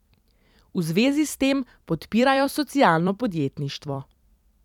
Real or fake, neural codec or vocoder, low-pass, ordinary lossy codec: fake; vocoder, 44.1 kHz, 128 mel bands every 256 samples, BigVGAN v2; 19.8 kHz; none